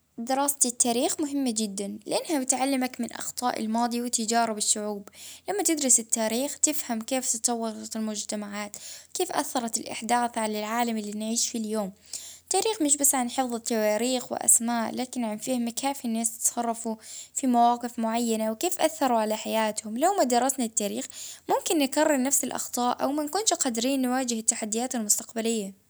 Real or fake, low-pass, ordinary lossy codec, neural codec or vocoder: real; none; none; none